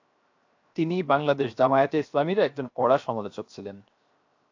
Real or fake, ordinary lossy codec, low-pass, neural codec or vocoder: fake; AAC, 48 kbps; 7.2 kHz; codec, 16 kHz, 0.7 kbps, FocalCodec